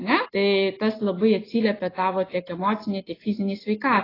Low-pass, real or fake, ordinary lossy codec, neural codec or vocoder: 5.4 kHz; real; AAC, 24 kbps; none